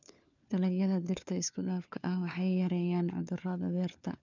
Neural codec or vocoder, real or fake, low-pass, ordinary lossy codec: codec, 16 kHz, 4 kbps, FunCodec, trained on LibriTTS, 50 frames a second; fake; 7.2 kHz; none